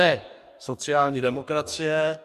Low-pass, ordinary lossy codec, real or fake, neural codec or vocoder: 14.4 kHz; MP3, 96 kbps; fake; codec, 44.1 kHz, 2.6 kbps, DAC